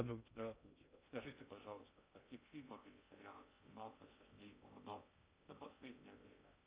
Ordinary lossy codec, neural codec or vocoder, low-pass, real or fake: Opus, 64 kbps; codec, 16 kHz in and 24 kHz out, 0.6 kbps, FocalCodec, streaming, 2048 codes; 3.6 kHz; fake